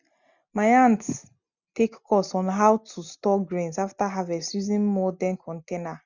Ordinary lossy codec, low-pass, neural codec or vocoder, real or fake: none; 7.2 kHz; none; real